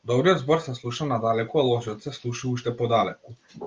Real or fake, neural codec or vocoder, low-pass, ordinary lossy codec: real; none; 7.2 kHz; Opus, 32 kbps